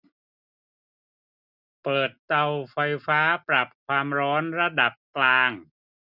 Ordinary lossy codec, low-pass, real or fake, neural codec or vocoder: none; 5.4 kHz; real; none